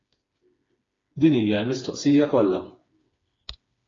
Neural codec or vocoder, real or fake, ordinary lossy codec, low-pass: codec, 16 kHz, 4 kbps, FreqCodec, smaller model; fake; AAC, 32 kbps; 7.2 kHz